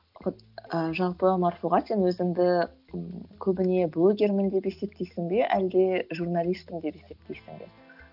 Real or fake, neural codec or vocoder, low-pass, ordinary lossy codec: real; none; 5.4 kHz; none